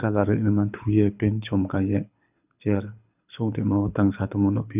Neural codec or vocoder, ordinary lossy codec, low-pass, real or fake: codec, 24 kHz, 6 kbps, HILCodec; none; 3.6 kHz; fake